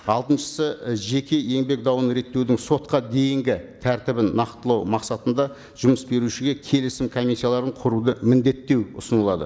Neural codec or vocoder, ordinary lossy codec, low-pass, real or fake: none; none; none; real